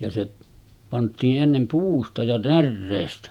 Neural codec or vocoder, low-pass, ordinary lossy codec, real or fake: vocoder, 48 kHz, 128 mel bands, Vocos; 19.8 kHz; none; fake